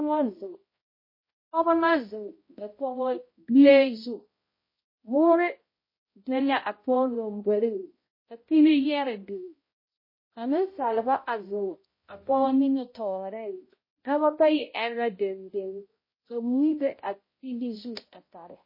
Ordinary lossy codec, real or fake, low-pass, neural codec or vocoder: MP3, 24 kbps; fake; 5.4 kHz; codec, 16 kHz, 0.5 kbps, X-Codec, HuBERT features, trained on balanced general audio